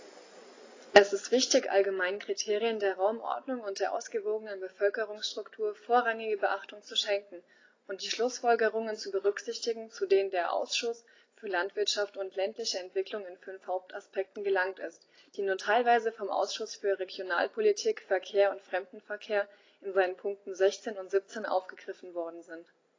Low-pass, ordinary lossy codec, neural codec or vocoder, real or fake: 7.2 kHz; AAC, 32 kbps; none; real